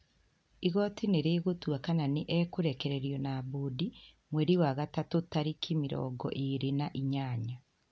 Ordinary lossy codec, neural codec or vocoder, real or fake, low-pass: none; none; real; none